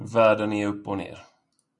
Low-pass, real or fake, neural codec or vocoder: 10.8 kHz; real; none